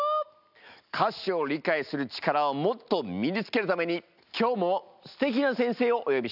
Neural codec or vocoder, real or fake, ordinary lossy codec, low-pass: none; real; none; 5.4 kHz